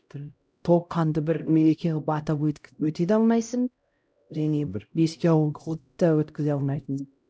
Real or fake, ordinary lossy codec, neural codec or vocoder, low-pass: fake; none; codec, 16 kHz, 0.5 kbps, X-Codec, HuBERT features, trained on LibriSpeech; none